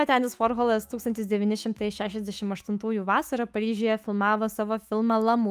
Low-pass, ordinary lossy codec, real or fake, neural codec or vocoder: 14.4 kHz; Opus, 32 kbps; fake; autoencoder, 48 kHz, 128 numbers a frame, DAC-VAE, trained on Japanese speech